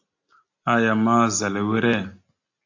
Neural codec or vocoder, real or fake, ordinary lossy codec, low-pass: none; real; AAC, 48 kbps; 7.2 kHz